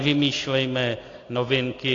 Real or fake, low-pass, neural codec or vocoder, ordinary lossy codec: real; 7.2 kHz; none; AAC, 32 kbps